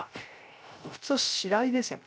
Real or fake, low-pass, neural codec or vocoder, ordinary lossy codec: fake; none; codec, 16 kHz, 0.3 kbps, FocalCodec; none